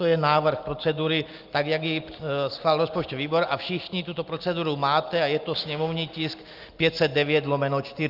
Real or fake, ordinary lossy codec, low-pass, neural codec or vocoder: real; Opus, 24 kbps; 5.4 kHz; none